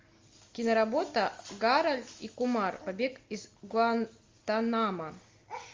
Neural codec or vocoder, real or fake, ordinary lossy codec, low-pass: none; real; Opus, 32 kbps; 7.2 kHz